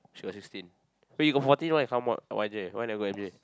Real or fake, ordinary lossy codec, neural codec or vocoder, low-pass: real; none; none; none